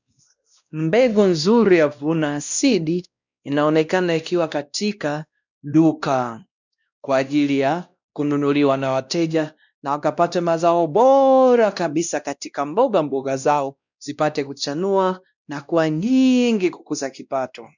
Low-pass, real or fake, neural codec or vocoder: 7.2 kHz; fake; codec, 16 kHz, 1 kbps, X-Codec, WavLM features, trained on Multilingual LibriSpeech